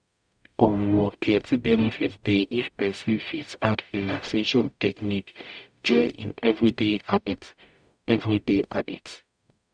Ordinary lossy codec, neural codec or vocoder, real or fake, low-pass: none; codec, 44.1 kHz, 0.9 kbps, DAC; fake; 9.9 kHz